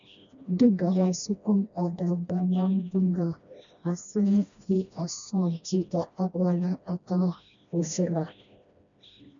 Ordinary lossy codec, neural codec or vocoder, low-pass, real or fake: MP3, 96 kbps; codec, 16 kHz, 1 kbps, FreqCodec, smaller model; 7.2 kHz; fake